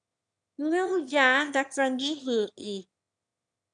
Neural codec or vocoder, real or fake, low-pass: autoencoder, 22.05 kHz, a latent of 192 numbers a frame, VITS, trained on one speaker; fake; 9.9 kHz